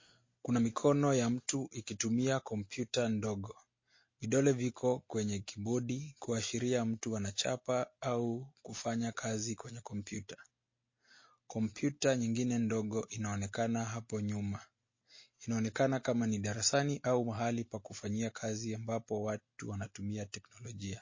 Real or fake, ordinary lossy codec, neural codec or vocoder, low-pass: real; MP3, 32 kbps; none; 7.2 kHz